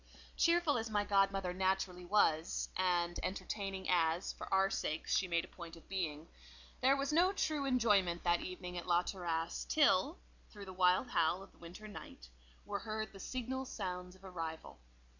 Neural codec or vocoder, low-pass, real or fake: none; 7.2 kHz; real